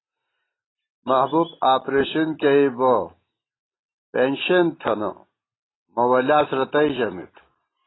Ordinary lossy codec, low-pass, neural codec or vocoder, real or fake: AAC, 16 kbps; 7.2 kHz; none; real